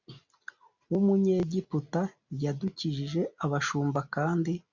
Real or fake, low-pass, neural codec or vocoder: real; 7.2 kHz; none